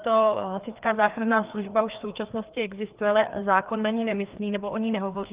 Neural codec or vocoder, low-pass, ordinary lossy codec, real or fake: codec, 16 kHz, 2 kbps, FreqCodec, larger model; 3.6 kHz; Opus, 32 kbps; fake